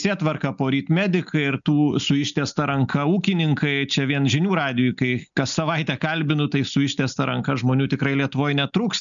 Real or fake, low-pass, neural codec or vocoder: real; 7.2 kHz; none